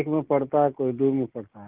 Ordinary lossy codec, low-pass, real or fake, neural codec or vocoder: Opus, 16 kbps; 3.6 kHz; real; none